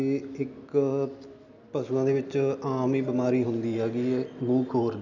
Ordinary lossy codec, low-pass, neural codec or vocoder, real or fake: none; 7.2 kHz; none; real